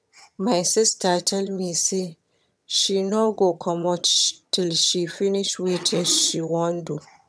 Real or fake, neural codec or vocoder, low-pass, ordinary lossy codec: fake; vocoder, 22.05 kHz, 80 mel bands, HiFi-GAN; none; none